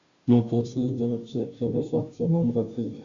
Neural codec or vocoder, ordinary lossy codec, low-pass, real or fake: codec, 16 kHz, 0.5 kbps, FunCodec, trained on Chinese and English, 25 frames a second; Opus, 64 kbps; 7.2 kHz; fake